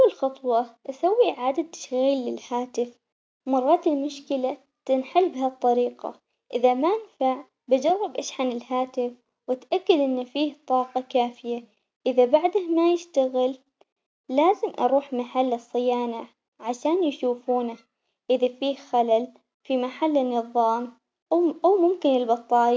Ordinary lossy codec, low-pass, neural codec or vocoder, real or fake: none; none; none; real